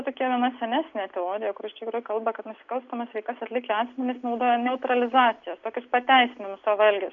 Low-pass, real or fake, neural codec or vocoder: 7.2 kHz; real; none